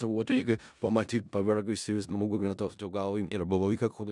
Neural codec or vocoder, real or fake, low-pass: codec, 16 kHz in and 24 kHz out, 0.4 kbps, LongCat-Audio-Codec, four codebook decoder; fake; 10.8 kHz